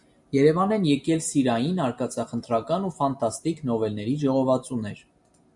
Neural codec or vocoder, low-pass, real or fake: none; 10.8 kHz; real